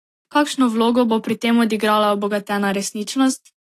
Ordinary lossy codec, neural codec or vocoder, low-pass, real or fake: AAC, 48 kbps; none; 14.4 kHz; real